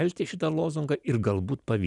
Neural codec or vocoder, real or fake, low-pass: none; real; 10.8 kHz